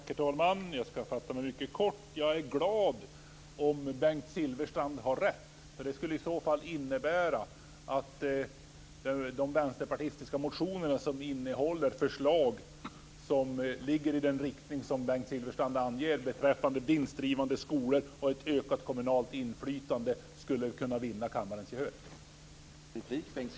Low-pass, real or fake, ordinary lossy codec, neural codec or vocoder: none; real; none; none